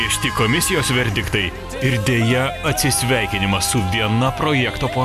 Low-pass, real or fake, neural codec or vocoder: 14.4 kHz; real; none